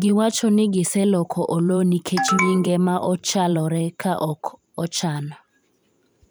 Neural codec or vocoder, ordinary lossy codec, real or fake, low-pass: vocoder, 44.1 kHz, 128 mel bands every 512 samples, BigVGAN v2; none; fake; none